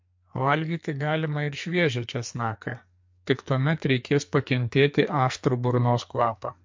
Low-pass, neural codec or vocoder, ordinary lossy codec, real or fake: 7.2 kHz; codec, 44.1 kHz, 2.6 kbps, SNAC; MP3, 48 kbps; fake